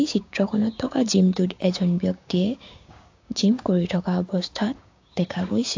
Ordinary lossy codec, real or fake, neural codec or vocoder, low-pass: AAC, 48 kbps; fake; codec, 16 kHz, 6 kbps, DAC; 7.2 kHz